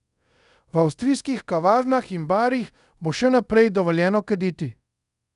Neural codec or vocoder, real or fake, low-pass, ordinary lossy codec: codec, 24 kHz, 0.5 kbps, DualCodec; fake; 10.8 kHz; none